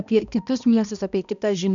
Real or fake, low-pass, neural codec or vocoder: fake; 7.2 kHz; codec, 16 kHz, 1 kbps, X-Codec, HuBERT features, trained on balanced general audio